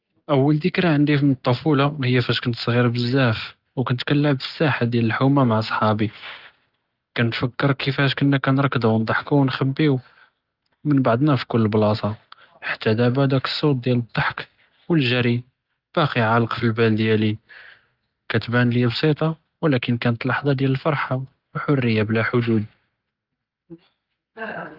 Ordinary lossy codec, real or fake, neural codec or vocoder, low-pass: Opus, 32 kbps; real; none; 5.4 kHz